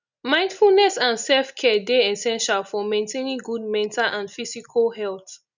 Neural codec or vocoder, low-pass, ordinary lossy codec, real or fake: none; 7.2 kHz; none; real